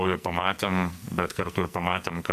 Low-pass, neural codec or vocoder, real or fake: 14.4 kHz; codec, 44.1 kHz, 2.6 kbps, SNAC; fake